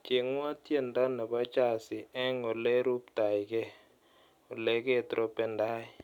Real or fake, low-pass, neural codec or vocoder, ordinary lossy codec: real; 19.8 kHz; none; none